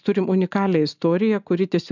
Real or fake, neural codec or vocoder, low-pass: real; none; 7.2 kHz